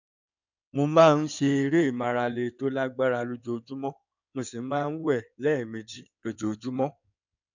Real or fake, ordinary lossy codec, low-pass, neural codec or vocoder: fake; none; 7.2 kHz; codec, 16 kHz in and 24 kHz out, 2.2 kbps, FireRedTTS-2 codec